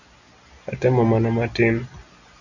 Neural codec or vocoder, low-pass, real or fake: none; 7.2 kHz; real